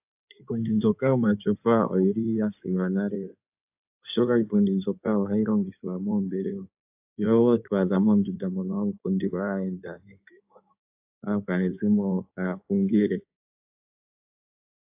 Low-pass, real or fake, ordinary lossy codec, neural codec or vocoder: 3.6 kHz; fake; AAC, 32 kbps; codec, 16 kHz in and 24 kHz out, 2.2 kbps, FireRedTTS-2 codec